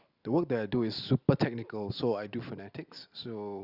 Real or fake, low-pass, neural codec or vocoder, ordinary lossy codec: real; 5.4 kHz; none; Opus, 64 kbps